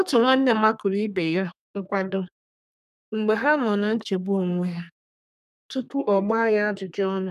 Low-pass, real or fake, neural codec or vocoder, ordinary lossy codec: 14.4 kHz; fake; codec, 32 kHz, 1.9 kbps, SNAC; none